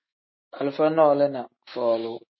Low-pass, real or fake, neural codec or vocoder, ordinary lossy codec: 7.2 kHz; fake; autoencoder, 48 kHz, 128 numbers a frame, DAC-VAE, trained on Japanese speech; MP3, 24 kbps